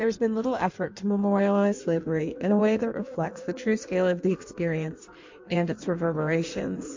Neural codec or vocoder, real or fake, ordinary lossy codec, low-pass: codec, 16 kHz in and 24 kHz out, 1.1 kbps, FireRedTTS-2 codec; fake; MP3, 48 kbps; 7.2 kHz